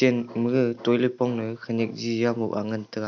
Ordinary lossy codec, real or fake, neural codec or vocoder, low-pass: none; real; none; 7.2 kHz